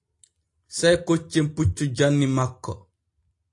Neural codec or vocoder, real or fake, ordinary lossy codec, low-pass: none; real; AAC, 48 kbps; 10.8 kHz